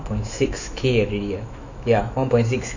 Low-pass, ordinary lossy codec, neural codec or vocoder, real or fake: 7.2 kHz; none; autoencoder, 48 kHz, 128 numbers a frame, DAC-VAE, trained on Japanese speech; fake